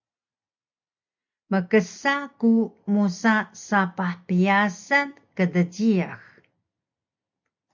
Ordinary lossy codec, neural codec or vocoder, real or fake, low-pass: MP3, 64 kbps; none; real; 7.2 kHz